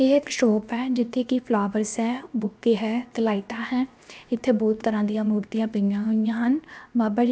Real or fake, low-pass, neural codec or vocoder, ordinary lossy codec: fake; none; codec, 16 kHz, 0.7 kbps, FocalCodec; none